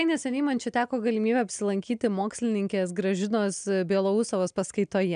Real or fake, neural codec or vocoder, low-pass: real; none; 9.9 kHz